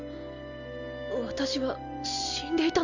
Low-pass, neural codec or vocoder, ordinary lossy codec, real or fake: 7.2 kHz; none; none; real